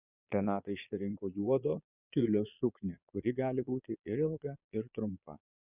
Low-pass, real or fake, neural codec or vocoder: 3.6 kHz; fake; vocoder, 22.05 kHz, 80 mel bands, Vocos